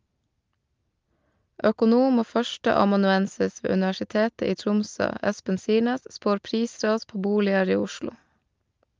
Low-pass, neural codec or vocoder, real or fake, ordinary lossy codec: 7.2 kHz; none; real; Opus, 24 kbps